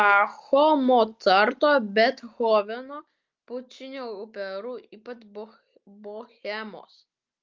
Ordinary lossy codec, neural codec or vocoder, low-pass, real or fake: Opus, 32 kbps; none; 7.2 kHz; real